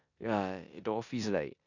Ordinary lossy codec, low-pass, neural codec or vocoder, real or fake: none; 7.2 kHz; codec, 16 kHz in and 24 kHz out, 0.9 kbps, LongCat-Audio-Codec, four codebook decoder; fake